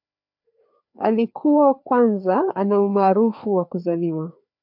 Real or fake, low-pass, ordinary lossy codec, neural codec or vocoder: fake; 5.4 kHz; AAC, 48 kbps; codec, 16 kHz, 2 kbps, FreqCodec, larger model